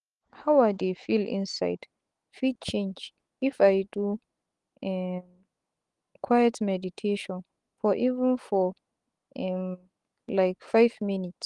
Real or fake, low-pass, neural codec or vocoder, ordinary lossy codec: fake; 10.8 kHz; autoencoder, 48 kHz, 128 numbers a frame, DAC-VAE, trained on Japanese speech; Opus, 24 kbps